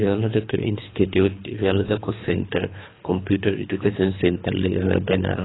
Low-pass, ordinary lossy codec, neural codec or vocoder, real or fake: 7.2 kHz; AAC, 16 kbps; codec, 24 kHz, 3 kbps, HILCodec; fake